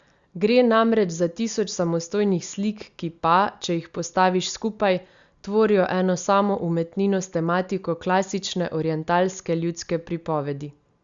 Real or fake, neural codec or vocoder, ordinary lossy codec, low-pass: real; none; Opus, 64 kbps; 7.2 kHz